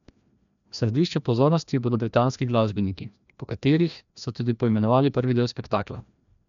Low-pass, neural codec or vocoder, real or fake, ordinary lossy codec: 7.2 kHz; codec, 16 kHz, 1 kbps, FreqCodec, larger model; fake; none